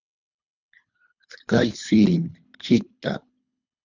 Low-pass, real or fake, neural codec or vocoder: 7.2 kHz; fake; codec, 24 kHz, 3 kbps, HILCodec